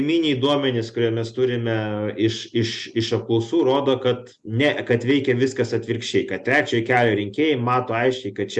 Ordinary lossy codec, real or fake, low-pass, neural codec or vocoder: Opus, 64 kbps; real; 10.8 kHz; none